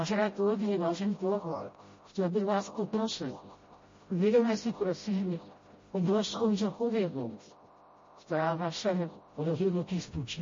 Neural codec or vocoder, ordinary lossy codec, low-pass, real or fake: codec, 16 kHz, 0.5 kbps, FreqCodec, smaller model; MP3, 32 kbps; 7.2 kHz; fake